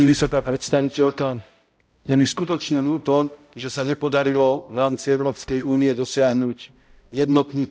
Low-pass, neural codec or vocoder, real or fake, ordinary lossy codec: none; codec, 16 kHz, 0.5 kbps, X-Codec, HuBERT features, trained on balanced general audio; fake; none